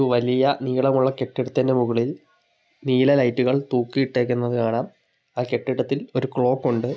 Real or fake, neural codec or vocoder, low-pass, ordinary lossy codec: real; none; none; none